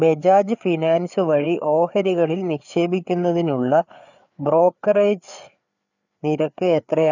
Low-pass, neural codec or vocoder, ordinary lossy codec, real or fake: 7.2 kHz; codec, 16 kHz, 4 kbps, FreqCodec, larger model; none; fake